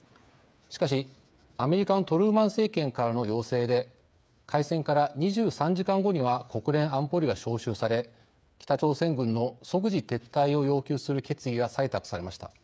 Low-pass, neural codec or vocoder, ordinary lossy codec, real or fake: none; codec, 16 kHz, 8 kbps, FreqCodec, smaller model; none; fake